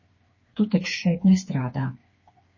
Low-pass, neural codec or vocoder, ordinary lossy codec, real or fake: 7.2 kHz; codec, 16 kHz, 4 kbps, FreqCodec, smaller model; MP3, 32 kbps; fake